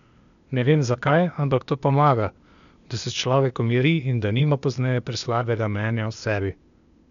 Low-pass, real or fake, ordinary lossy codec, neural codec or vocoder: 7.2 kHz; fake; none; codec, 16 kHz, 0.8 kbps, ZipCodec